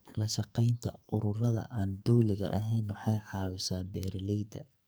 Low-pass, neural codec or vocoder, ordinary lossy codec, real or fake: none; codec, 44.1 kHz, 2.6 kbps, SNAC; none; fake